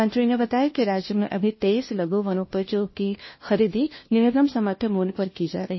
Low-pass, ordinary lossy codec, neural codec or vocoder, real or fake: 7.2 kHz; MP3, 24 kbps; codec, 16 kHz, 1 kbps, FunCodec, trained on LibriTTS, 50 frames a second; fake